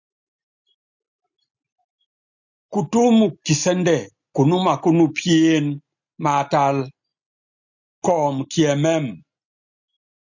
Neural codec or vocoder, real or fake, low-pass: none; real; 7.2 kHz